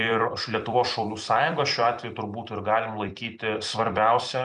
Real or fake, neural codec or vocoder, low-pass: fake; vocoder, 44.1 kHz, 128 mel bands every 256 samples, BigVGAN v2; 10.8 kHz